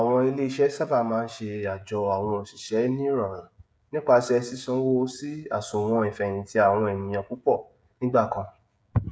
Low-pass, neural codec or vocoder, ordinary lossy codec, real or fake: none; codec, 16 kHz, 16 kbps, FreqCodec, smaller model; none; fake